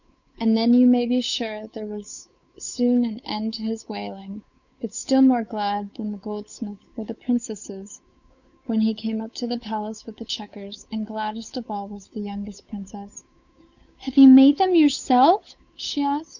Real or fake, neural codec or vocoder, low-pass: fake; codec, 16 kHz, 8 kbps, FunCodec, trained on Chinese and English, 25 frames a second; 7.2 kHz